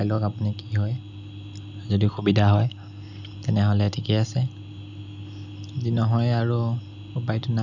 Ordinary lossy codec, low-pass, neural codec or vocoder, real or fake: none; 7.2 kHz; none; real